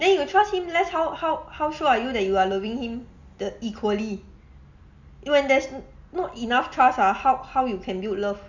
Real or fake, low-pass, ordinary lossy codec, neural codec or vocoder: real; 7.2 kHz; none; none